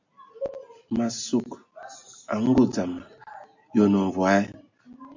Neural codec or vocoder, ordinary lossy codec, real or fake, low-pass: none; MP3, 48 kbps; real; 7.2 kHz